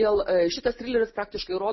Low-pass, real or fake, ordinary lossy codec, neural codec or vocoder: 7.2 kHz; real; MP3, 24 kbps; none